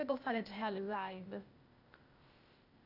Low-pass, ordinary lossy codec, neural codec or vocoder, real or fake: 5.4 kHz; Opus, 64 kbps; codec, 16 kHz, 0.8 kbps, ZipCodec; fake